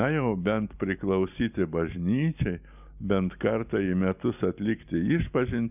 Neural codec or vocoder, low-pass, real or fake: none; 3.6 kHz; real